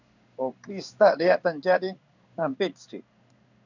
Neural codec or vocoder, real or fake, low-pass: codec, 16 kHz in and 24 kHz out, 1 kbps, XY-Tokenizer; fake; 7.2 kHz